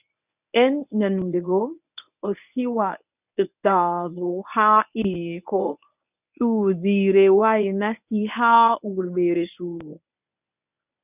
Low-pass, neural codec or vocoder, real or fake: 3.6 kHz; codec, 24 kHz, 0.9 kbps, WavTokenizer, medium speech release version 1; fake